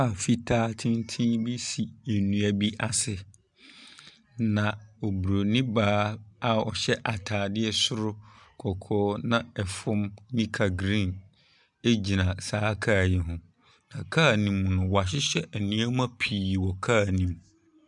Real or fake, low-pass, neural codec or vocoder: real; 10.8 kHz; none